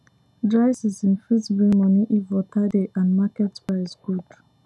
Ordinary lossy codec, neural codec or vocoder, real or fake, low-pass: none; none; real; none